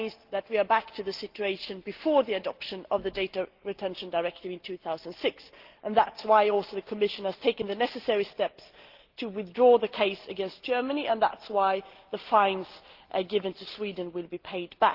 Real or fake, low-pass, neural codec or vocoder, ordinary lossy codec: real; 5.4 kHz; none; Opus, 16 kbps